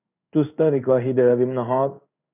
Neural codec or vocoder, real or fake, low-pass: codec, 16 kHz in and 24 kHz out, 1 kbps, XY-Tokenizer; fake; 3.6 kHz